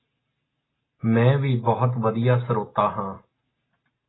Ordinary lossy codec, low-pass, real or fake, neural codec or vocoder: AAC, 16 kbps; 7.2 kHz; real; none